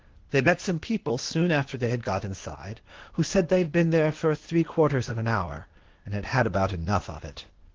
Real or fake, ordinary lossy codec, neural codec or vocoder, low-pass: fake; Opus, 16 kbps; codec, 16 kHz, 0.8 kbps, ZipCodec; 7.2 kHz